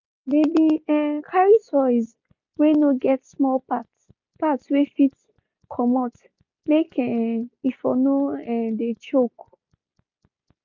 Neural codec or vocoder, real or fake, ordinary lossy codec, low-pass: none; real; MP3, 64 kbps; 7.2 kHz